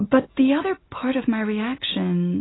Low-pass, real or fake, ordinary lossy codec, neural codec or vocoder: 7.2 kHz; real; AAC, 16 kbps; none